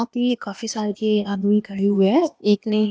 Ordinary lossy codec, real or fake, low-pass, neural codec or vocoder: none; fake; none; codec, 16 kHz, 0.8 kbps, ZipCodec